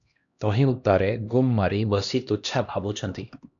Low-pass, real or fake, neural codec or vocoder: 7.2 kHz; fake; codec, 16 kHz, 1 kbps, X-Codec, HuBERT features, trained on LibriSpeech